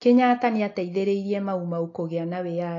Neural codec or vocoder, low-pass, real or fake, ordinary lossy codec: none; 7.2 kHz; real; AAC, 32 kbps